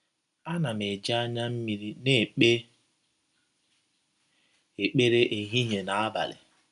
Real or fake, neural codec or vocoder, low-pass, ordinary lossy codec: real; none; 10.8 kHz; none